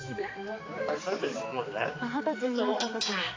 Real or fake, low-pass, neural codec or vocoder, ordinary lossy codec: fake; 7.2 kHz; codec, 16 kHz, 4 kbps, X-Codec, HuBERT features, trained on balanced general audio; AAC, 32 kbps